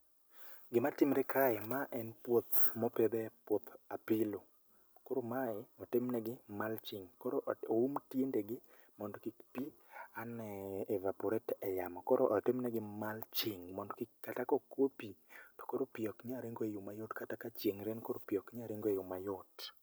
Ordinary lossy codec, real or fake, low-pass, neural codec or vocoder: none; real; none; none